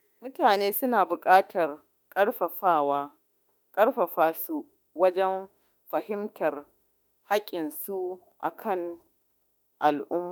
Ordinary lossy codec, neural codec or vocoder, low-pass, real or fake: none; autoencoder, 48 kHz, 32 numbers a frame, DAC-VAE, trained on Japanese speech; none; fake